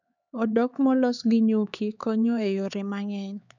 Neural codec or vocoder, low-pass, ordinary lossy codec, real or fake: codec, 16 kHz, 4 kbps, X-Codec, HuBERT features, trained on LibriSpeech; 7.2 kHz; none; fake